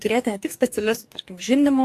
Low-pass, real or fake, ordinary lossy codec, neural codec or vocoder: 14.4 kHz; fake; AAC, 64 kbps; codec, 44.1 kHz, 2.6 kbps, DAC